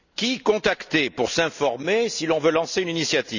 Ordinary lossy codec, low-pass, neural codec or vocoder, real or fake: none; 7.2 kHz; none; real